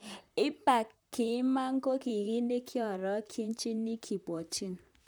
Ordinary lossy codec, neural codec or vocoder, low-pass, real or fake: none; vocoder, 44.1 kHz, 128 mel bands, Pupu-Vocoder; none; fake